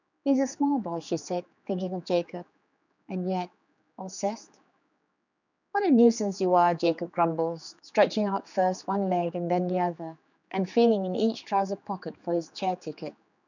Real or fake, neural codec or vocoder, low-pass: fake; codec, 16 kHz, 4 kbps, X-Codec, HuBERT features, trained on general audio; 7.2 kHz